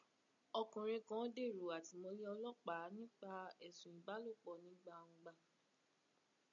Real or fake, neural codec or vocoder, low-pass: real; none; 7.2 kHz